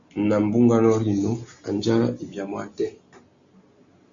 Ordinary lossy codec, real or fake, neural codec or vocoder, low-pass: Opus, 64 kbps; real; none; 7.2 kHz